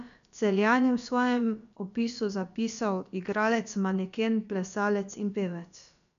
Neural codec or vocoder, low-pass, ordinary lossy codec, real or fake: codec, 16 kHz, about 1 kbps, DyCAST, with the encoder's durations; 7.2 kHz; none; fake